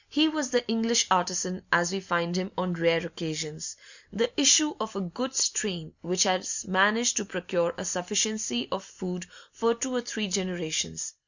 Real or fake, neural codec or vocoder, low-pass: real; none; 7.2 kHz